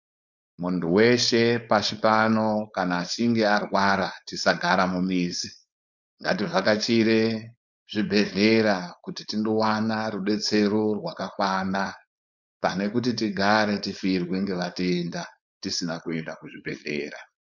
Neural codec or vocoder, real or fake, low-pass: codec, 16 kHz, 4.8 kbps, FACodec; fake; 7.2 kHz